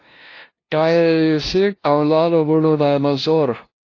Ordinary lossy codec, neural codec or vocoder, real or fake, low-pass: AAC, 32 kbps; codec, 16 kHz, 0.5 kbps, FunCodec, trained on LibriTTS, 25 frames a second; fake; 7.2 kHz